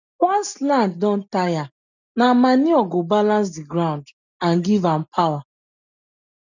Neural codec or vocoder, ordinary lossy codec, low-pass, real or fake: none; none; 7.2 kHz; real